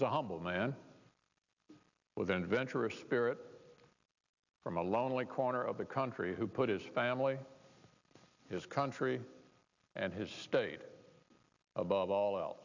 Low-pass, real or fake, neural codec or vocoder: 7.2 kHz; real; none